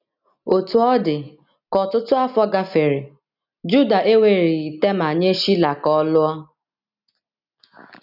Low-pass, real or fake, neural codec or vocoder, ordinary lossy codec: 5.4 kHz; real; none; none